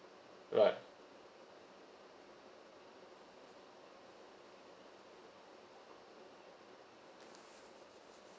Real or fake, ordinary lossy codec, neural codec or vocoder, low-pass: real; none; none; none